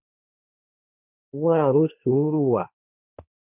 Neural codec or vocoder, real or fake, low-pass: codec, 16 kHz, 1.1 kbps, Voila-Tokenizer; fake; 3.6 kHz